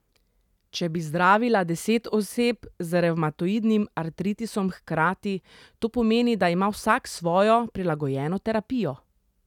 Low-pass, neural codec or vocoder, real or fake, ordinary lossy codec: 19.8 kHz; none; real; none